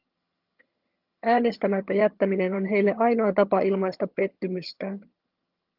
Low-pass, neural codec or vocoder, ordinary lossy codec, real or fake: 5.4 kHz; vocoder, 22.05 kHz, 80 mel bands, HiFi-GAN; Opus, 32 kbps; fake